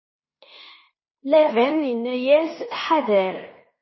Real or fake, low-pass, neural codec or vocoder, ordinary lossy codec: fake; 7.2 kHz; codec, 16 kHz in and 24 kHz out, 0.9 kbps, LongCat-Audio-Codec, fine tuned four codebook decoder; MP3, 24 kbps